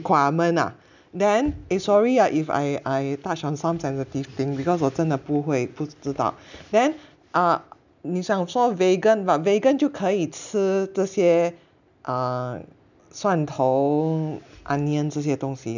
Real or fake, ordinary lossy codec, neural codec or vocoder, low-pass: real; none; none; 7.2 kHz